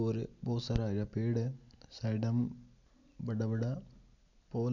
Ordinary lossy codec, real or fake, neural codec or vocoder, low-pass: none; real; none; 7.2 kHz